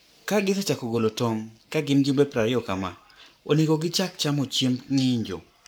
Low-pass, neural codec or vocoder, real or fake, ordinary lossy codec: none; codec, 44.1 kHz, 7.8 kbps, Pupu-Codec; fake; none